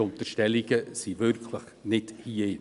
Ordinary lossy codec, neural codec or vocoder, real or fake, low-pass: none; none; real; 10.8 kHz